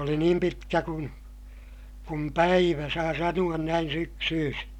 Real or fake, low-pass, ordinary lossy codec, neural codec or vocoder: real; 19.8 kHz; none; none